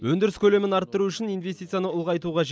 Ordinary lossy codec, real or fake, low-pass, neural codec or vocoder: none; real; none; none